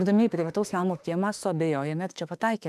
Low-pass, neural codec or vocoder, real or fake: 14.4 kHz; autoencoder, 48 kHz, 32 numbers a frame, DAC-VAE, trained on Japanese speech; fake